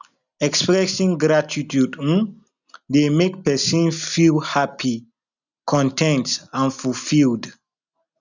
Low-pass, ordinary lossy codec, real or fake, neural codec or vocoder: 7.2 kHz; none; real; none